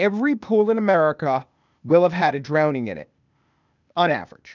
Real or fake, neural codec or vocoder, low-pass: fake; codec, 16 kHz, 0.8 kbps, ZipCodec; 7.2 kHz